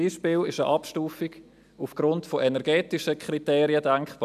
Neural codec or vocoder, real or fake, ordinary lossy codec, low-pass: none; real; none; 14.4 kHz